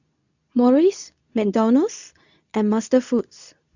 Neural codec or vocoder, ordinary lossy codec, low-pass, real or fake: codec, 24 kHz, 0.9 kbps, WavTokenizer, medium speech release version 2; none; 7.2 kHz; fake